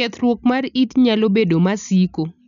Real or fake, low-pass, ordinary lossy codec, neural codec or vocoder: real; 7.2 kHz; none; none